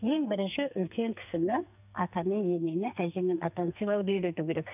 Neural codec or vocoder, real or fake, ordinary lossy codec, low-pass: codec, 44.1 kHz, 2.6 kbps, SNAC; fake; none; 3.6 kHz